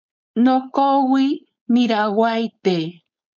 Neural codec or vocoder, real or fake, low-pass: codec, 16 kHz, 4.8 kbps, FACodec; fake; 7.2 kHz